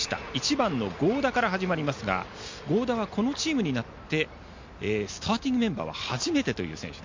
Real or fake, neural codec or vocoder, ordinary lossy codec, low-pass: real; none; MP3, 48 kbps; 7.2 kHz